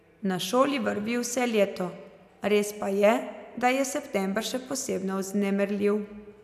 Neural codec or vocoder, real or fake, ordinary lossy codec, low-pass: none; real; none; 14.4 kHz